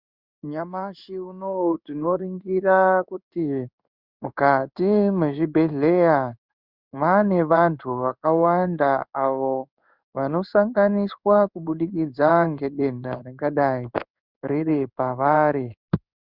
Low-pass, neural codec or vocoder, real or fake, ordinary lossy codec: 5.4 kHz; codec, 16 kHz in and 24 kHz out, 1 kbps, XY-Tokenizer; fake; Opus, 64 kbps